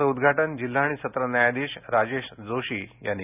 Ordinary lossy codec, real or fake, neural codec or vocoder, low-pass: none; real; none; 3.6 kHz